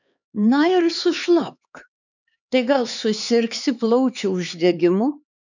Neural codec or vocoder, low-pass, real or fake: codec, 16 kHz, 4 kbps, X-Codec, HuBERT features, trained on LibriSpeech; 7.2 kHz; fake